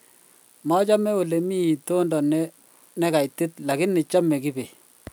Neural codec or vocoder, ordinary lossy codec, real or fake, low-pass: none; none; real; none